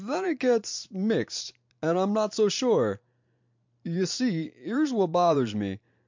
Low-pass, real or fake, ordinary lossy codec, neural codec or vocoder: 7.2 kHz; fake; MP3, 64 kbps; vocoder, 44.1 kHz, 128 mel bands every 512 samples, BigVGAN v2